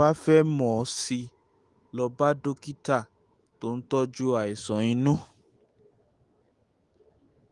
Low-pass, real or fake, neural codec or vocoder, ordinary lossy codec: 10.8 kHz; fake; autoencoder, 48 kHz, 128 numbers a frame, DAC-VAE, trained on Japanese speech; Opus, 24 kbps